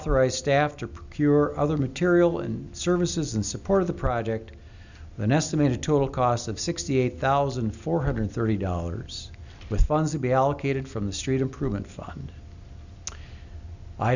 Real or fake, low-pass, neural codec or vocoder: real; 7.2 kHz; none